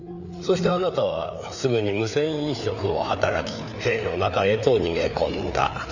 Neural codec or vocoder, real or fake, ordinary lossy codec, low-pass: codec, 16 kHz, 4 kbps, FreqCodec, larger model; fake; none; 7.2 kHz